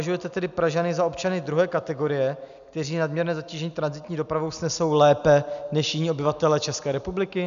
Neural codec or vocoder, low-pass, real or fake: none; 7.2 kHz; real